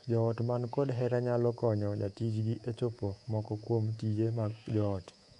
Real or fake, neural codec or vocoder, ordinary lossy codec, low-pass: fake; codec, 24 kHz, 3.1 kbps, DualCodec; none; 10.8 kHz